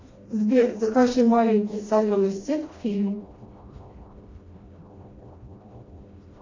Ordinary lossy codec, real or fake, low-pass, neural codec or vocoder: AAC, 32 kbps; fake; 7.2 kHz; codec, 16 kHz, 1 kbps, FreqCodec, smaller model